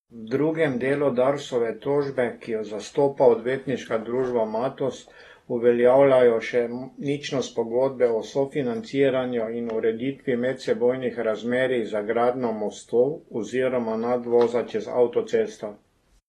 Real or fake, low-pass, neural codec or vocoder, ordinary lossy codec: real; 19.8 kHz; none; AAC, 32 kbps